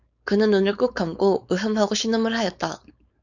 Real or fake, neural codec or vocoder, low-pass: fake; codec, 16 kHz, 4.8 kbps, FACodec; 7.2 kHz